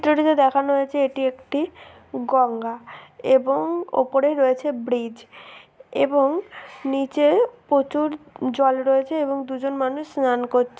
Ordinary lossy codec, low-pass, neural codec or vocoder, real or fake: none; none; none; real